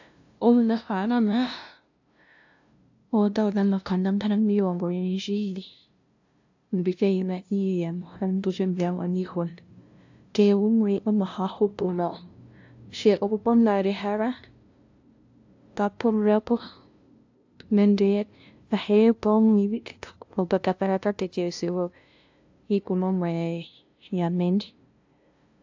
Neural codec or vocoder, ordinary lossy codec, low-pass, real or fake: codec, 16 kHz, 0.5 kbps, FunCodec, trained on LibriTTS, 25 frames a second; AAC, 48 kbps; 7.2 kHz; fake